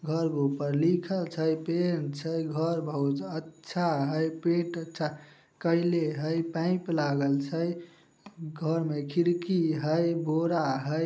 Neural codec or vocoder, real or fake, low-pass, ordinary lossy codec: none; real; none; none